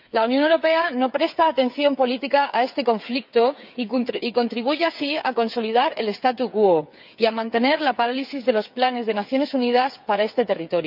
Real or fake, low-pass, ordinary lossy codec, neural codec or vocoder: fake; 5.4 kHz; none; codec, 16 kHz, 8 kbps, FreqCodec, smaller model